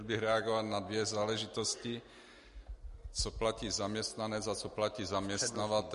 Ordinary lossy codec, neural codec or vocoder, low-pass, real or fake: MP3, 48 kbps; none; 14.4 kHz; real